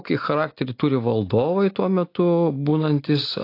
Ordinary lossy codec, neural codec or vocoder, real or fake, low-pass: AAC, 32 kbps; none; real; 5.4 kHz